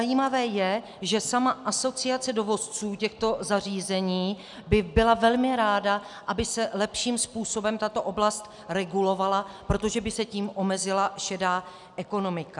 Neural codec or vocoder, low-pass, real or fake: none; 10.8 kHz; real